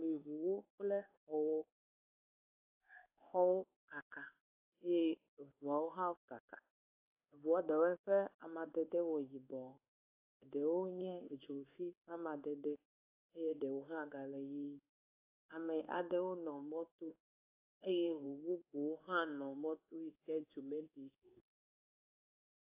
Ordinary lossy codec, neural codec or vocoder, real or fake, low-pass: AAC, 24 kbps; codec, 16 kHz in and 24 kHz out, 1 kbps, XY-Tokenizer; fake; 3.6 kHz